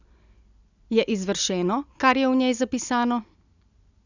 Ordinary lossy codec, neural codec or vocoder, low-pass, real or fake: none; none; 7.2 kHz; real